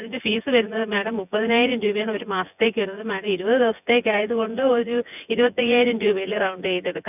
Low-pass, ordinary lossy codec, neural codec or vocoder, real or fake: 3.6 kHz; none; vocoder, 24 kHz, 100 mel bands, Vocos; fake